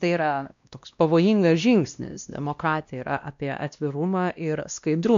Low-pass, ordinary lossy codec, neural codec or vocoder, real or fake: 7.2 kHz; MP3, 64 kbps; codec, 16 kHz, 1 kbps, X-Codec, WavLM features, trained on Multilingual LibriSpeech; fake